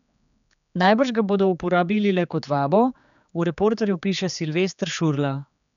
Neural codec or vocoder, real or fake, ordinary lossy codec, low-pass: codec, 16 kHz, 4 kbps, X-Codec, HuBERT features, trained on general audio; fake; none; 7.2 kHz